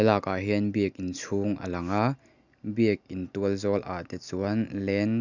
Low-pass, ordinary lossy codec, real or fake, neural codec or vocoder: 7.2 kHz; none; real; none